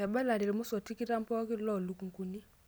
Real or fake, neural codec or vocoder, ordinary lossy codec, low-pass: real; none; none; none